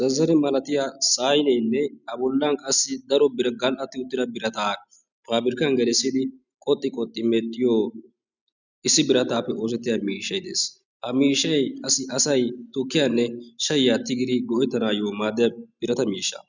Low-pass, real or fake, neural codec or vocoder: 7.2 kHz; real; none